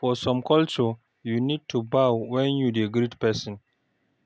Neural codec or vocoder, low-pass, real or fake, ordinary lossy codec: none; none; real; none